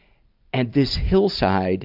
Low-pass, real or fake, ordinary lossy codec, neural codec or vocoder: 5.4 kHz; real; AAC, 48 kbps; none